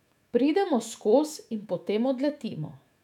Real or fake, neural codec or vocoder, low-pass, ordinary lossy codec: fake; autoencoder, 48 kHz, 128 numbers a frame, DAC-VAE, trained on Japanese speech; 19.8 kHz; none